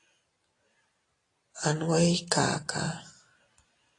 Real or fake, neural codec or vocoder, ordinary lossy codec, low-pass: real; none; AAC, 32 kbps; 10.8 kHz